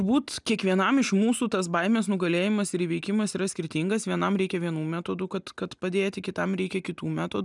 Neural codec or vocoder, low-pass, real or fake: none; 10.8 kHz; real